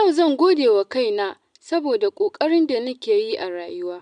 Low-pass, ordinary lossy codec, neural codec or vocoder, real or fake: 9.9 kHz; MP3, 96 kbps; none; real